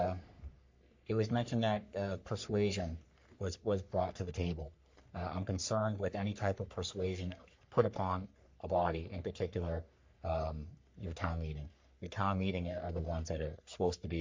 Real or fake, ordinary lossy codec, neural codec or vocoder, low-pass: fake; MP3, 48 kbps; codec, 44.1 kHz, 3.4 kbps, Pupu-Codec; 7.2 kHz